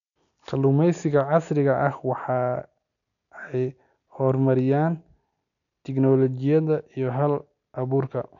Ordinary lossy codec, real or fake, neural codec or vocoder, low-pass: none; real; none; 7.2 kHz